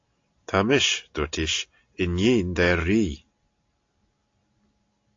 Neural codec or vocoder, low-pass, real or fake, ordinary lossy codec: none; 7.2 kHz; real; AAC, 48 kbps